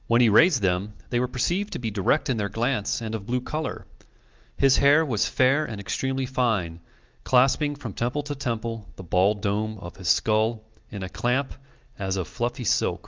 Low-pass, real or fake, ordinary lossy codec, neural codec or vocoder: 7.2 kHz; real; Opus, 32 kbps; none